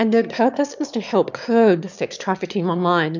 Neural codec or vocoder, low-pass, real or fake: autoencoder, 22.05 kHz, a latent of 192 numbers a frame, VITS, trained on one speaker; 7.2 kHz; fake